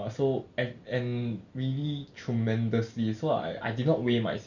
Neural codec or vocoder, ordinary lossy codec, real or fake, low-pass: none; none; real; 7.2 kHz